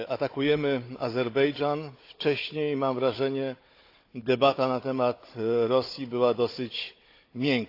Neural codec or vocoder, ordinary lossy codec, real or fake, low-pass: codec, 16 kHz, 16 kbps, FunCodec, trained on Chinese and English, 50 frames a second; AAC, 32 kbps; fake; 5.4 kHz